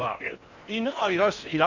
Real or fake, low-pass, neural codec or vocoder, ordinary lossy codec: fake; 7.2 kHz; codec, 16 kHz in and 24 kHz out, 0.8 kbps, FocalCodec, streaming, 65536 codes; none